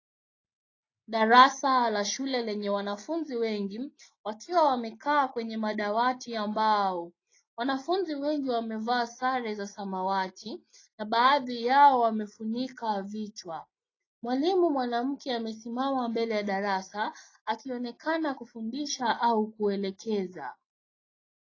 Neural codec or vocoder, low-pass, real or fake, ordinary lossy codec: none; 7.2 kHz; real; AAC, 32 kbps